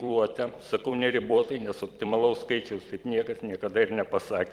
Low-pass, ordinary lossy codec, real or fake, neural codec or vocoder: 19.8 kHz; Opus, 24 kbps; fake; vocoder, 44.1 kHz, 128 mel bands every 256 samples, BigVGAN v2